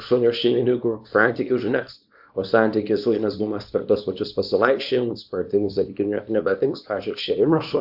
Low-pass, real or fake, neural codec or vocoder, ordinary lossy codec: 5.4 kHz; fake; codec, 24 kHz, 0.9 kbps, WavTokenizer, small release; AAC, 48 kbps